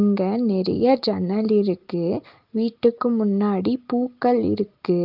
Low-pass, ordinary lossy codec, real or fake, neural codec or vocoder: 5.4 kHz; Opus, 32 kbps; real; none